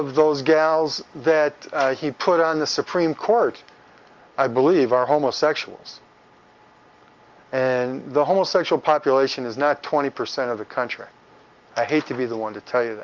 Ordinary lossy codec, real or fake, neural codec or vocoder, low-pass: Opus, 32 kbps; real; none; 7.2 kHz